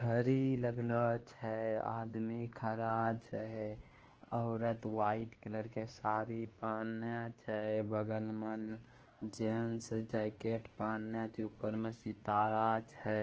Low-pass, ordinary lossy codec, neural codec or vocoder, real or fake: 7.2 kHz; Opus, 16 kbps; codec, 16 kHz, 2 kbps, X-Codec, WavLM features, trained on Multilingual LibriSpeech; fake